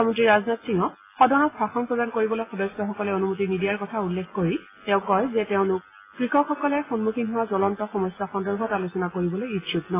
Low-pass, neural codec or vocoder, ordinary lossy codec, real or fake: 3.6 kHz; none; AAC, 16 kbps; real